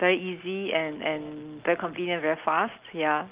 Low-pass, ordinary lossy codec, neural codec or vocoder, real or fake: 3.6 kHz; Opus, 24 kbps; none; real